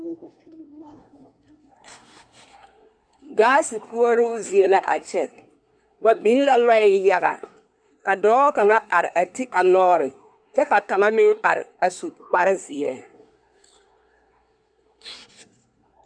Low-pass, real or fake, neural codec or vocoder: 9.9 kHz; fake; codec, 24 kHz, 1 kbps, SNAC